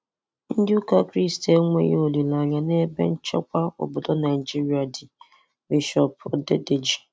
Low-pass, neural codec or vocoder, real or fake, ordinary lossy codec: none; none; real; none